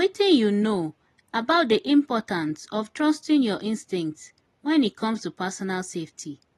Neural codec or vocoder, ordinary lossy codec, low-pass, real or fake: none; AAC, 32 kbps; 19.8 kHz; real